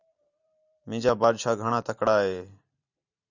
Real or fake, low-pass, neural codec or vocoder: fake; 7.2 kHz; vocoder, 44.1 kHz, 128 mel bands every 512 samples, BigVGAN v2